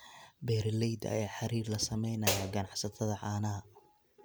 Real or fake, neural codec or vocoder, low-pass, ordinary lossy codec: real; none; none; none